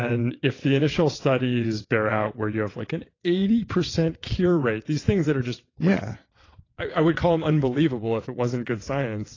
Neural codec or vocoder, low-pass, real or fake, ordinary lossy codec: vocoder, 22.05 kHz, 80 mel bands, WaveNeXt; 7.2 kHz; fake; AAC, 32 kbps